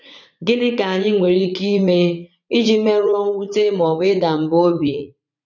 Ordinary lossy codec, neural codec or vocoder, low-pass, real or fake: none; vocoder, 44.1 kHz, 80 mel bands, Vocos; 7.2 kHz; fake